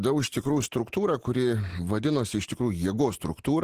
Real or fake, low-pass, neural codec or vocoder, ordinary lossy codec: real; 14.4 kHz; none; Opus, 16 kbps